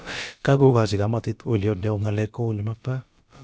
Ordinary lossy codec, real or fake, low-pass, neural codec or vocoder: none; fake; none; codec, 16 kHz, about 1 kbps, DyCAST, with the encoder's durations